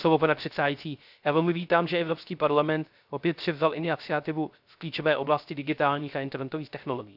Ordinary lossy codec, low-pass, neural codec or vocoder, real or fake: none; 5.4 kHz; codec, 16 kHz, 0.3 kbps, FocalCodec; fake